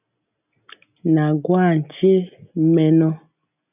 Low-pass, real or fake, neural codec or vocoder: 3.6 kHz; real; none